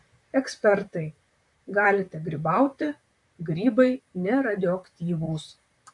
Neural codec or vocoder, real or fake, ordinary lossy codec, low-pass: vocoder, 44.1 kHz, 128 mel bands, Pupu-Vocoder; fake; AAC, 64 kbps; 10.8 kHz